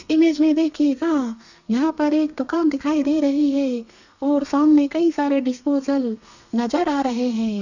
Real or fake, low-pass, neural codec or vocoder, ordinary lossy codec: fake; 7.2 kHz; codec, 32 kHz, 1.9 kbps, SNAC; none